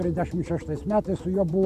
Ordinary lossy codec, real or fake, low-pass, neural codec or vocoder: AAC, 96 kbps; real; 14.4 kHz; none